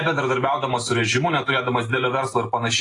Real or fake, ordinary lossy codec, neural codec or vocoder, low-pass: real; AAC, 32 kbps; none; 10.8 kHz